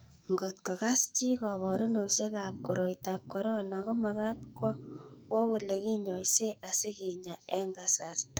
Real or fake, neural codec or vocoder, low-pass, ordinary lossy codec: fake; codec, 44.1 kHz, 2.6 kbps, SNAC; none; none